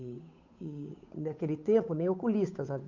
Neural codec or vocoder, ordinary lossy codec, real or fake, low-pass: codec, 16 kHz, 8 kbps, FunCodec, trained on Chinese and English, 25 frames a second; none; fake; 7.2 kHz